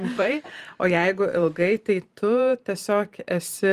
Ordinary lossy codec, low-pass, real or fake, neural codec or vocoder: Opus, 32 kbps; 14.4 kHz; fake; vocoder, 44.1 kHz, 128 mel bands every 512 samples, BigVGAN v2